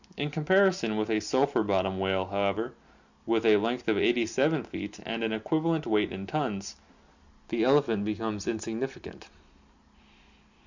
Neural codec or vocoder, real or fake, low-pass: none; real; 7.2 kHz